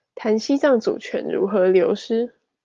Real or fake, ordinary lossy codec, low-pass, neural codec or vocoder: real; Opus, 32 kbps; 7.2 kHz; none